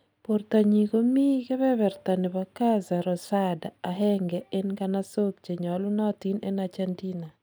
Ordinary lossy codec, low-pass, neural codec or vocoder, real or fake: none; none; none; real